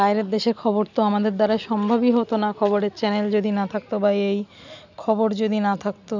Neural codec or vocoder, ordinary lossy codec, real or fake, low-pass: none; none; real; 7.2 kHz